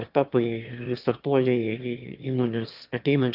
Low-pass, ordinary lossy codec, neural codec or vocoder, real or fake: 5.4 kHz; Opus, 24 kbps; autoencoder, 22.05 kHz, a latent of 192 numbers a frame, VITS, trained on one speaker; fake